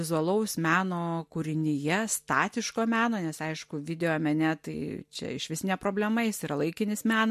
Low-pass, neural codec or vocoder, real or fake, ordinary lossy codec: 14.4 kHz; none; real; MP3, 64 kbps